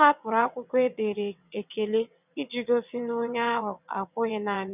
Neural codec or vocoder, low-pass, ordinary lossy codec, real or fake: vocoder, 22.05 kHz, 80 mel bands, WaveNeXt; 3.6 kHz; none; fake